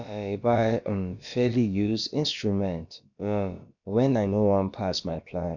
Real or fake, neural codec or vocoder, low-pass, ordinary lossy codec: fake; codec, 16 kHz, about 1 kbps, DyCAST, with the encoder's durations; 7.2 kHz; none